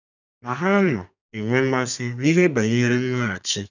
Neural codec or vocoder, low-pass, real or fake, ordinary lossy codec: codec, 32 kHz, 1.9 kbps, SNAC; 7.2 kHz; fake; none